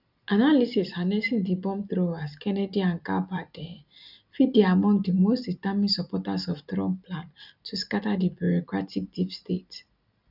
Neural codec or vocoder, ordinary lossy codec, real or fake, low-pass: none; none; real; 5.4 kHz